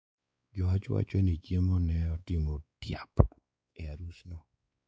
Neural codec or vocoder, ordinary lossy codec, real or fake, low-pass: codec, 16 kHz, 4 kbps, X-Codec, WavLM features, trained on Multilingual LibriSpeech; none; fake; none